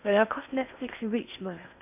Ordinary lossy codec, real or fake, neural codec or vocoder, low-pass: none; fake; codec, 16 kHz in and 24 kHz out, 0.6 kbps, FocalCodec, streaming, 4096 codes; 3.6 kHz